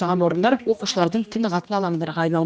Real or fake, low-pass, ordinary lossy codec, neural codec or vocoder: fake; none; none; codec, 16 kHz, 1 kbps, X-Codec, HuBERT features, trained on general audio